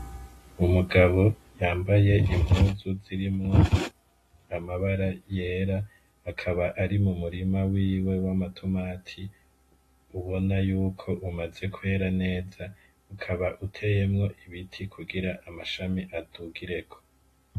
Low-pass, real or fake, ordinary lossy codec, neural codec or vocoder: 14.4 kHz; real; AAC, 48 kbps; none